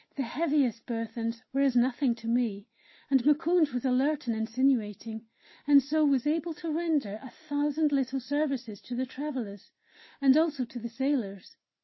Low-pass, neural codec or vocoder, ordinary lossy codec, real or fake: 7.2 kHz; none; MP3, 24 kbps; real